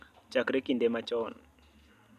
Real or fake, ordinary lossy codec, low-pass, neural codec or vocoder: real; none; 14.4 kHz; none